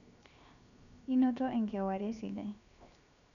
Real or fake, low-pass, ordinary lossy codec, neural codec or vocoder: fake; 7.2 kHz; none; codec, 16 kHz, 0.7 kbps, FocalCodec